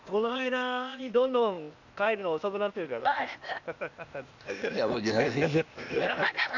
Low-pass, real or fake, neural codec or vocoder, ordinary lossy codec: 7.2 kHz; fake; codec, 16 kHz, 0.8 kbps, ZipCodec; none